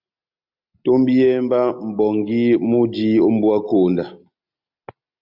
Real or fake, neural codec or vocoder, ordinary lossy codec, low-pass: real; none; MP3, 48 kbps; 5.4 kHz